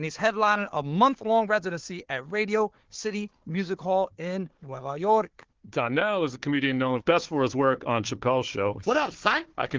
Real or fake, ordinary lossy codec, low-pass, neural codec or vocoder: fake; Opus, 16 kbps; 7.2 kHz; codec, 16 kHz, 4 kbps, FunCodec, trained on Chinese and English, 50 frames a second